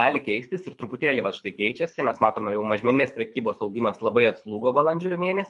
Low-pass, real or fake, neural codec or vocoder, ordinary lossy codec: 10.8 kHz; fake; codec, 24 kHz, 3 kbps, HILCodec; MP3, 64 kbps